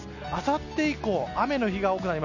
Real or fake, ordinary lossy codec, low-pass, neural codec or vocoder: real; none; 7.2 kHz; none